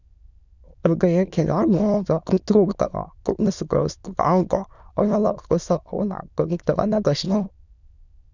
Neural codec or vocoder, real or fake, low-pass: autoencoder, 22.05 kHz, a latent of 192 numbers a frame, VITS, trained on many speakers; fake; 7.2 kHz